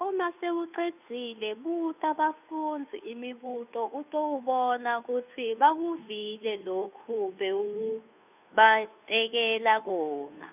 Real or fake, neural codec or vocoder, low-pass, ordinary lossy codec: fake; codec, 16 kHz, 2 kbps, FunCodec, trained on Chinese and English, 25 frames a second; 3.6 kHz; none